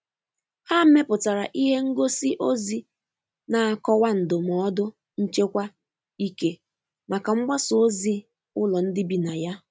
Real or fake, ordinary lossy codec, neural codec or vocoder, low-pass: real; none; none; none